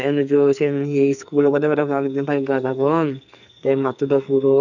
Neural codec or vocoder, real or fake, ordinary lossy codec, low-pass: codec, 44.1 kHz, 2.6 kbps, SNAC; fake; none; 7.2 kHz